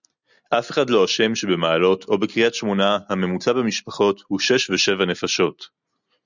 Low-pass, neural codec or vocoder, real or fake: 7.2 kHz; none; real